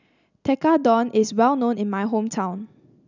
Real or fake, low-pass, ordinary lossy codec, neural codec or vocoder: real; 7.2 kHz; none; none